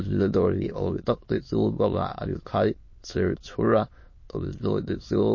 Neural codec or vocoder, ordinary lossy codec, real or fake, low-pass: autoencoder, 22.05 kHz, a latent of 192 numbers a frame, VITS, trained on many speakers; MP3, 32 kbps; fake; 7.2 kHz